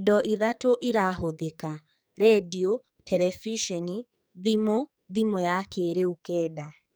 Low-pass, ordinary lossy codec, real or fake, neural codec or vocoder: none; none; fake; codec, 44.1 kHz, 2.6 kbps, SNAC